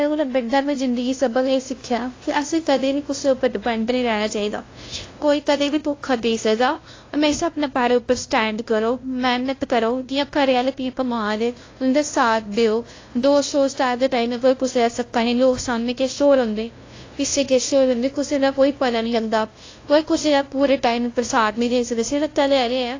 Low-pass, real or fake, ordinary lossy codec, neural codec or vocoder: 7.2 kHz; fake; AAC, 32 kbps; codec, 16 kHz, 0.5 kbps, FunCodec, trained on LibriTTS, 25 frames a second